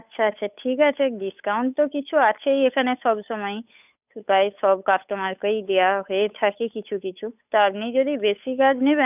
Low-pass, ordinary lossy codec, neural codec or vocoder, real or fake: 3.6 kHz; none; codec, 16 kHz, 8 kbps, FunCodec, trained on Chinese and English, 25 frames a second; fake